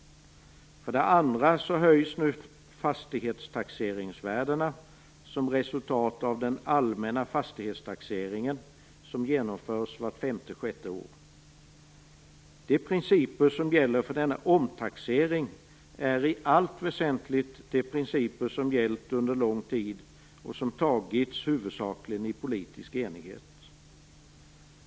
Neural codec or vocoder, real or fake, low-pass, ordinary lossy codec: none; real; none; none